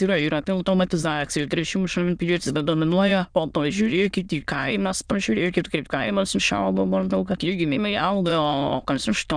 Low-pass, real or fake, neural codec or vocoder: 9.9 kHz; fake; autoencoder, 22.05 kHz, a latent of 192 numbers a frame, VITS, trained on many speakers